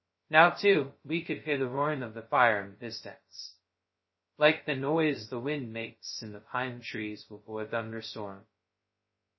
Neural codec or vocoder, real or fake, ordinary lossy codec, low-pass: codec, 16 kHz, 0.2 kbps, FocalCodec; fake; MP3, 24 kbps; 7.2 kHz